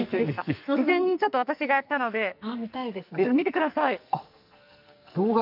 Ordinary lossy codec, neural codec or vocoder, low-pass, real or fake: none; codec, 44.1 kHz, 2.6 kbps, SNAC; 5.4 kHz; fake